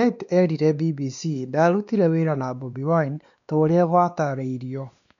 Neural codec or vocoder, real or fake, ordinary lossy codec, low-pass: codec, 16 kHz, 2 kbps, X-Codec, WavLM features, trained on Multilingual LibriSpeech; fake; MP3, 96 kbps; 7.2 kHz